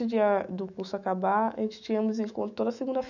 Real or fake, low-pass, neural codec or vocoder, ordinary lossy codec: fake; 7.2 kHz; autoencoder, 48 kHz, 128 numbers a frame, DAC-VAE, trained on Japanese speech; none